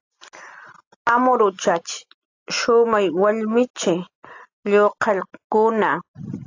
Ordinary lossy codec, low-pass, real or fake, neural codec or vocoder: AAC, 48 kbps; 7.2 kHz; real; none